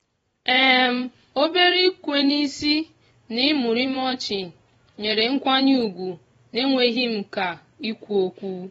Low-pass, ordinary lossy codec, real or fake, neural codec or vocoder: 10.8 kHz; AAC, 24 kbps; fake; vocoder, 24 kHz, 100 mel bands, Vocos